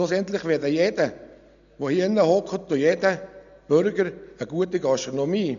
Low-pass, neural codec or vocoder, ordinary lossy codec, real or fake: 7.2 kHz; none; none; real